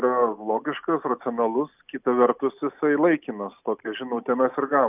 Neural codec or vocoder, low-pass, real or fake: none; 3.6 kHz; real